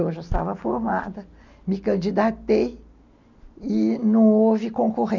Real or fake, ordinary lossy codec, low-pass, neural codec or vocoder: real; none; 7.2 kHz; none